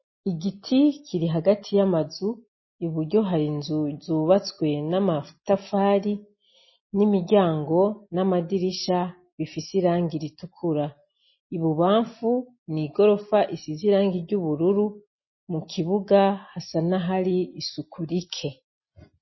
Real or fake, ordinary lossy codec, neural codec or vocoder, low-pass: real; MP3, 24 kbps; none; 7.2 kHz